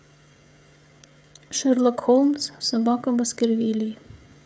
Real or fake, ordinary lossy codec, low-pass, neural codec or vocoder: fake; none; none; codec, 16 kHz, 16 kbps, FreqCodec, smaller model